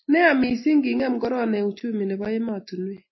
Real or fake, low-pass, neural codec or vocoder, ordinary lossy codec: real; 7.2 kHz; none; MP3, 24 kbps